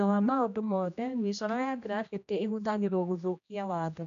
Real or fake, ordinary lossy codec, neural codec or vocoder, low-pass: fake; MP3, 96 kbps; codec, 16 kHz, 1 kbps, X-Codec, HuBERT features, trained on general audio; 7.2 kHz